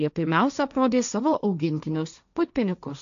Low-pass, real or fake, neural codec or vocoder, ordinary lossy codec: 7.2 kHz; fake; codec, 16 kHz, 1.1 kbps, Voila-Tokenizer; AAC, 96 kbps